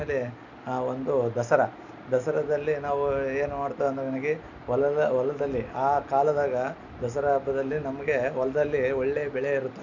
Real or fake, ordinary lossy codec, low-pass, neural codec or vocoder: real; none; 7.2 kHz; none